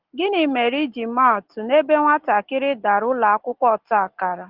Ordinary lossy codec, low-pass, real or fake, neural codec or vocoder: Opus, 16 kbps; 5.4 kHz; real; none